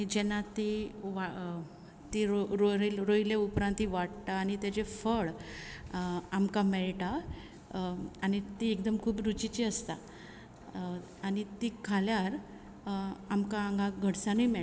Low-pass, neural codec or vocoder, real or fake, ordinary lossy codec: none; none; real; none